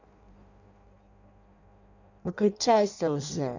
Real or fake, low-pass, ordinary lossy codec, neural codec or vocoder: fake; 7.2 kHz; none; codec, 16 kHz in and 24 kHz out, 0.6 kbps, FireRedTTS-2 codec